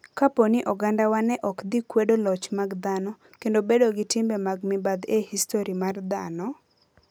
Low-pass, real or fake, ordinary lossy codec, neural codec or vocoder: none; real; none; none